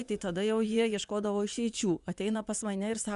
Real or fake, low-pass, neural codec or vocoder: fake; 10.8 kHz; vocoder, 24 kHz, 100 mel bands, Vocos